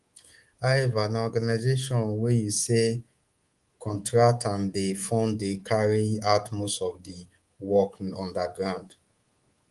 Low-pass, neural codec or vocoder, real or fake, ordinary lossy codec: 10.8 kHz; codec, 24 kHz, 3.1 kbps, DualCodec; fake; Opus, 24 kbps